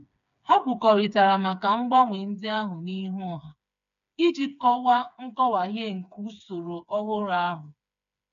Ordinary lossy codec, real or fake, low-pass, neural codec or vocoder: none; fake; 7.2 kHz; codec, 16 kHz, 4 kbps, FreqCodec, smaller model